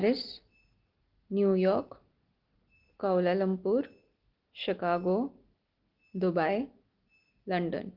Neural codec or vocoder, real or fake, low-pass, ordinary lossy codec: none; real; 5.4 kHz; Opus, 16 kbps